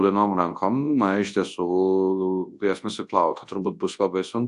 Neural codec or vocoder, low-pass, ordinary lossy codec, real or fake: codec, 24 kHz, 0.9 kbps, WavTokenizer, large speech release; 10.8 kHz; AAC, 64 kbps; fake